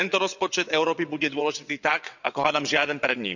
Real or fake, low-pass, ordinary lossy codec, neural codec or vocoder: fake; 7.2 kHz; none; vocoder, 22.05 kHz, 80 mel bands, WaveNeXt